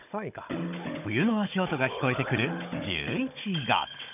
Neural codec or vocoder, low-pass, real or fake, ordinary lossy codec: codec, 16 kHz, 16 kbps, FunCodec, trained on Chinese and English, 50 frames a second; 3.6 kHz; fake; none